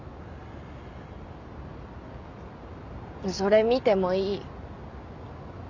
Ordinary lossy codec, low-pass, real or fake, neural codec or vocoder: none; 7.2 kHz; real; none